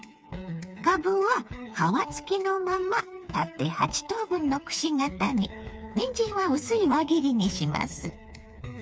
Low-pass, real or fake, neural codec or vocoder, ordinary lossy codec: none; fake; codec, 16 kHz, 4 kbps, FreqCodec, smaller model; none